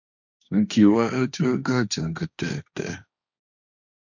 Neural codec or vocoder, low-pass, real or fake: codec, 16 kHz, 1.1 kbps, Voila-Tokenizer; 7.2 kHz; fake